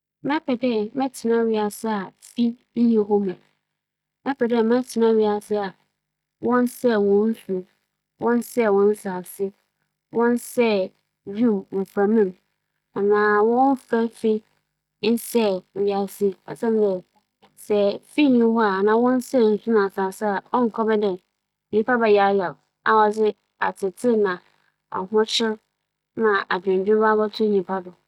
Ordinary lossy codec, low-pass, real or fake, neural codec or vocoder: none; 19.8 kHz; real; none